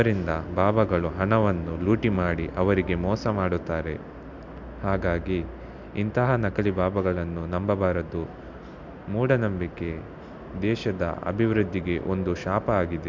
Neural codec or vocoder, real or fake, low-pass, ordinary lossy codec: none; real; 7.2 kHz; MP3, 64 kbps